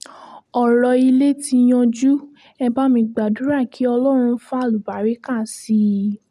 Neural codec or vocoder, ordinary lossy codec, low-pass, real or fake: none; none; 14.4 kHz; real